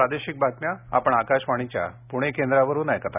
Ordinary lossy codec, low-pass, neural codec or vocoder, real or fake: none; 3.6 kHz; none; real